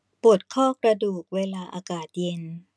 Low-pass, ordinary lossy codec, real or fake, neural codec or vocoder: none; none; real; none